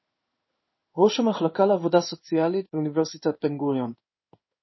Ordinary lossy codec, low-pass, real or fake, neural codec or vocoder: MP3, 24 kbps; 7.2 kHz; fake; codec, 16 kHz in and 24 kHz out, 1 kbps, XY-Tokenizer